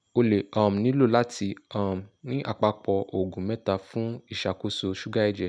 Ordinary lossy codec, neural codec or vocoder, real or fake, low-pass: none; none; real; 9.9 kHz